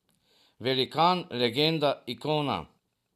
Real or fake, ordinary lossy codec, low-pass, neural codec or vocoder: real; none; 14.4 kHz; none